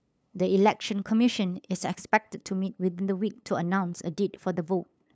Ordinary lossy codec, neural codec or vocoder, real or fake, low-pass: none; codec, 16 kHz, 8 kbps, FunCodec, trained on LibriTTS, 25 frames a second; fake; none